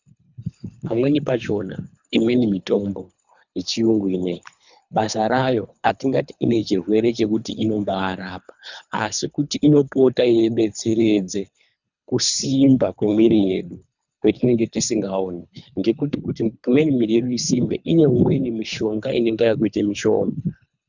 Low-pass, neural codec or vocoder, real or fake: 7.2 kHz; codec, 24 kHz, 3 kbps, HILCodec; fake